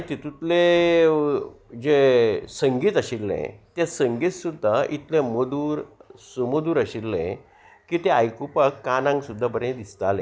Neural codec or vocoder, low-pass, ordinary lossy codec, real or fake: none; none; none; real